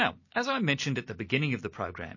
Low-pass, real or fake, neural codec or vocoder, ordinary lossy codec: 7.2 kHz; real; none; MP3, 32 kbps